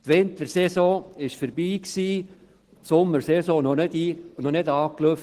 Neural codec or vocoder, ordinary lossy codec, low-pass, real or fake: none; Opus, 16 kbps; 14.4 kHz; real